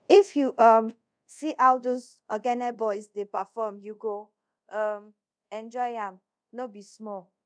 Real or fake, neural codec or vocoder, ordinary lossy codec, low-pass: fake; codec, 24 kHz, 0.5 kbps, DualCodec; none; 9.9 kHz